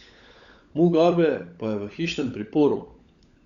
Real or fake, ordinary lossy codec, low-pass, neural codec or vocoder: fake; Opus, 64 kbps; 7.2 kHz; codec, 16 kHz, 16 kbps, FunCodec, trained on LibriTTS, 50 frames a second